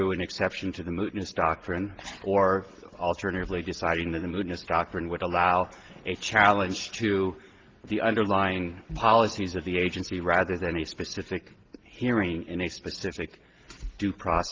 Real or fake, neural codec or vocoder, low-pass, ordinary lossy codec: real; none; 7.2 kHz; Opus, 16 kbps